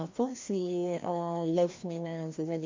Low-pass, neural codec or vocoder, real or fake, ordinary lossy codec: 7.2 kHz; codec, 16 kHz, 1 kbps, FreqCodec, larger model; fake; MP3, 48 kbps